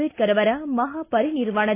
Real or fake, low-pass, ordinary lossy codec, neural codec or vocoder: real; 3.6 kHz; AAC, 24 kbps; none